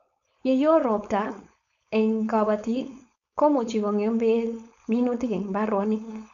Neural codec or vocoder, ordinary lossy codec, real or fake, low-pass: codec, 16 kHz, 4.8 kbps, FACodec; none; fake; 7.2 kHz